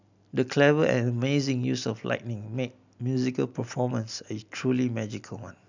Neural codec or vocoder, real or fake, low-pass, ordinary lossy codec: none; real; 7.2 kHz; none